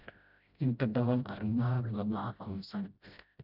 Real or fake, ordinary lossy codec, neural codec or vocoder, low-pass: fake; none; codec, 16 kHz, 0.5 kbps, FreqCodec, smaller model; 5.4 kHz